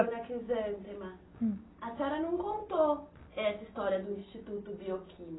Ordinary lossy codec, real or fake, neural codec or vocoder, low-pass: AAC, 16 kbps; real; none; 7.2 kHz